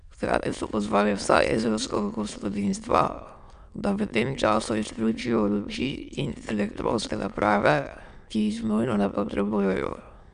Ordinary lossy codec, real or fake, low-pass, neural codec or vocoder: none; fake; 9.9 kHz; autoencoder, 22.05 kHz, a latent of 192 numbers a frame, VITS, trained on many speakers